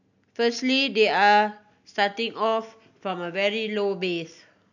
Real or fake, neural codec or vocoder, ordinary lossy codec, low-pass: real; none; none; 7.2 kHz